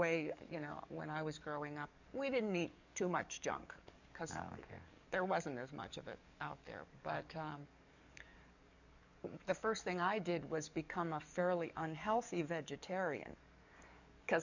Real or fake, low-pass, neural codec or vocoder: fake; 7.2 kHz; codec, 44.1 kHz, 7.8 kbps, DAC